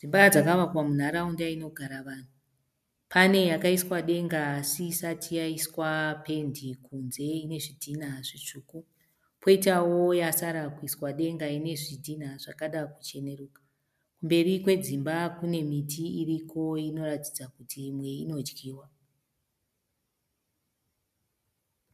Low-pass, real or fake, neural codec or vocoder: 14.4 kHz; real; none